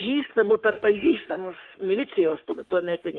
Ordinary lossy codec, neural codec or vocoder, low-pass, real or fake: Opus, 24 kbps; codec, 44.1 kHz, 3.4 kbps, Pupu-Codec; 10.8 kHz; fake